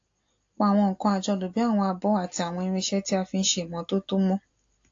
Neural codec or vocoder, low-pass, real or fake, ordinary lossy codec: none; 7.2 kHz; real; AAC, 48 kbps